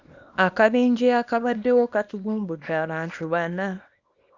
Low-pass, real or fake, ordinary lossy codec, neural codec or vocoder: 7.2 kHz; fake; Opus, 64 kbps; codec, 24 kHz, 0.9 kbps, WavTokenizer, small release